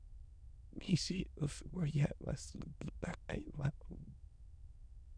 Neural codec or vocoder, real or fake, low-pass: autoencoder, 22.05 kHz, a latent of 192 numbers a frame, VITS, trained on many speakers; fake; 9.9 kHz